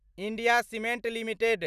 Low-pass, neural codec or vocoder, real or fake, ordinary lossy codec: 14.4 kHz; none; real; none